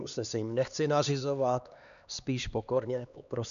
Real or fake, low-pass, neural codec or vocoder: fake; 7.2 kHz; codec, 16 kHz, 2 kbps, X-Codec, HuBERT features, trained on LibriSpeech